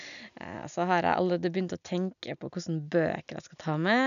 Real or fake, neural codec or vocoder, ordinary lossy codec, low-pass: real; none; none; 7.2 kHz